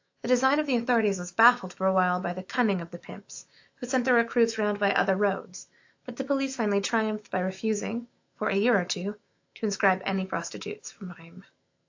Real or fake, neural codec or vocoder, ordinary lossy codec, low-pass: fake; autoencoder, 48 kHz, 128 numbers a frame, DAC-VAE, trained on Japanese speech; AAC, 48 kbps; 7.2 kHz